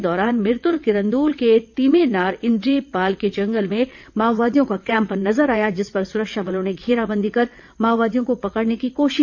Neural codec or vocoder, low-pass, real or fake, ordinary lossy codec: vocoder, 22.05 kHz, 80 mel bands, WaveNeXt; 7.2 kHz; fake; none